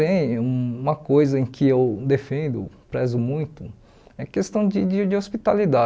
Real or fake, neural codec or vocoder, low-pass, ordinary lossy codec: real; none; none; none